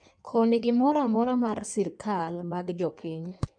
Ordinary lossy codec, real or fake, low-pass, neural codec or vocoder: none; fake; 9.9 kHz; codec, 16 kHz in and 24 kHz out, 1.1 kbps, FireRedTTS-2 codec